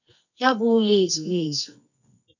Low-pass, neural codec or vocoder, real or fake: 7.2 kHz; codec, 24 kHz, 0.9 kbps, WavTokenizer, medium music audio release; fake